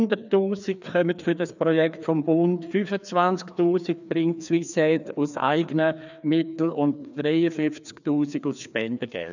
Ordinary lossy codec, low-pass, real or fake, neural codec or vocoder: none; 7.2 kHz; fake; codec, 16 kHz, 2 kbps, FreqCodec, larger model